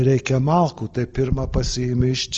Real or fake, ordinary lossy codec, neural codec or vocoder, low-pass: real; Opus, 24 kbps; none; 7.2 kHz